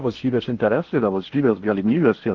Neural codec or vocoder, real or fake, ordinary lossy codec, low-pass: codec, 16 kHz in and 24 kHz out, 0.8 kbps, FocalCodec, streaming, 65536 codes; fake; Opus, 16 kbps; 7.2 kHz